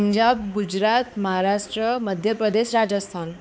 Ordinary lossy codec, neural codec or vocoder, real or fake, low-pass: none; codec, 16 kHz, 4 kbps, X-Codec, HuBERT features, trained on balanced general audio; fake; none